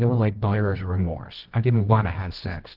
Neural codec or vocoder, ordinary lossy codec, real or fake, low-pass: codec, 24 kHz, 0.9 kbps, WavTokenizer, medium music audio release; Opus, 16 kbps; fake; 5.4 kHz